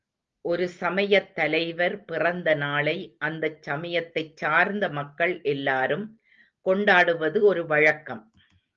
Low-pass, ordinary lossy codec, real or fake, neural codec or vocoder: 7.2 kHz; Opus, 32 kbps; real; none